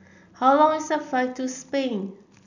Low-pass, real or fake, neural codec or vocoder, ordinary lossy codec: 7.2 kHz; real; none; none